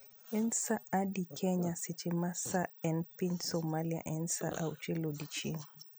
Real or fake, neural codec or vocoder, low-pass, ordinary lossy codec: real; none; none; none